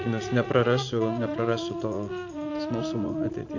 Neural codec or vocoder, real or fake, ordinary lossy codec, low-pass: vocoder, 44.1 kHz, 80 mel bands, Vocos; fake; AAC, 48 kbps; 7.2 kHz